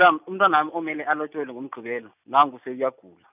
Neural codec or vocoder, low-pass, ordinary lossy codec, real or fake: none; 3.6 kHz; none; real